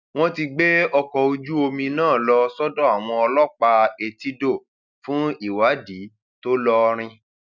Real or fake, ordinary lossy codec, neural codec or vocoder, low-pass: real; none; none; 7.2 kHz